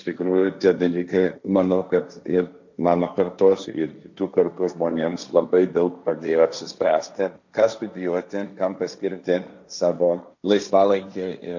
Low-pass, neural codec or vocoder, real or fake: 7.2 kHz; codec, 16 kHz, 1.1 kbps, Voila-Tokenizer; fake